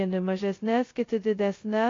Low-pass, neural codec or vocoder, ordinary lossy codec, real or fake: 7.2 kHz; codec, 16 kHz, 0.2 kbps, FocalCodec; AAC, 32 kbps; fake